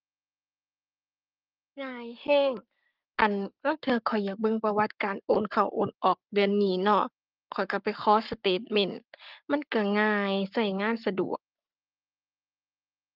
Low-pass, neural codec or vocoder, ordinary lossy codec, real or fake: 5.4 kHz; codec, 44.1 kHz, 7.8 kbps, Pupu-Codec; Opus, 24 kbps; fake